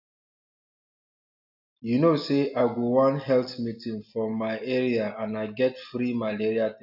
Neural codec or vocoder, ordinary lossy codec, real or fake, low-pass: none; AAC, 48 kbps; real; 5.4 kHz